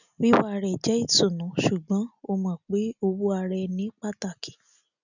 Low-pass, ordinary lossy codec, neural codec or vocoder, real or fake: 7.2 kHz; none; none; real